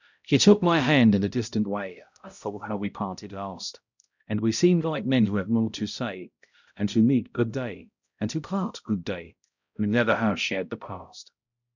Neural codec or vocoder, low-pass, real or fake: codec, 16 kHz, 0.5 kbps, X-Codec, HuBERT features, trained on balanced general audio; 7.2 kHz; fake